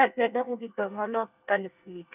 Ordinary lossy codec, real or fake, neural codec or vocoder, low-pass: none; fake; codec, 24 kHz, 1 kbps, SNAC; 3.6 kHz